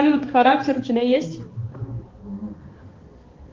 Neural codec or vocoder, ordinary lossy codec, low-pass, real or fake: codec, 16 kHz, 2 kbps, X-Codec, HuBERT features, trained on balanced general audio; Opus, 32 kbps; 7.2 kHz; fake